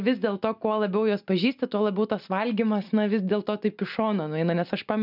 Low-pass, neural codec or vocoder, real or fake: 5.4 kHz; none; real